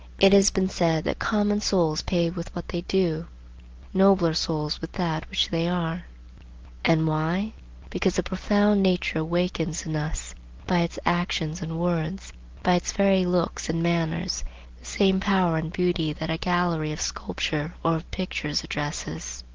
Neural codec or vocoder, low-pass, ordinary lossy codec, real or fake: none; 7.2 kHz; Opus, 16 kbps; real